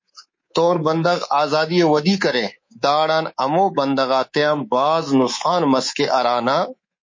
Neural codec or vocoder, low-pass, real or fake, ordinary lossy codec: codec, 24 kHz, 3.1 kbps, DualCodec; 7.2 kHz; fake; MP3, 32 kbps